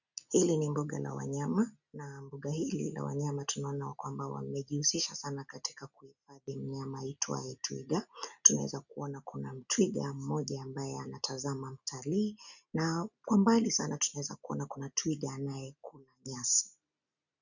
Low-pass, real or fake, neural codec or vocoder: 7.2 kHz; real; none